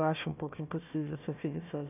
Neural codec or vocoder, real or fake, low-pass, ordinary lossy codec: codec, 16 kHz, 1 kbps, FunCodec, trained on Chinese and English, 50 frames a second; fake; 3.6 kHz; none